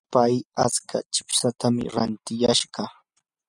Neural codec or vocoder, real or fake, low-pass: none; real; 10.8 kHz